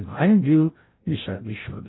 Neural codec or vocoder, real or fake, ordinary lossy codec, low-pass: codec, 16 kHz, 0.5 kbps, FreqCodec, larger model; fake; AAC, 16 kbps; 7.2 kHz